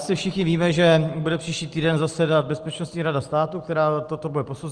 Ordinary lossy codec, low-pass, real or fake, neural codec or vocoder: Opus, 24 kbps; 9.9 kHz; real; none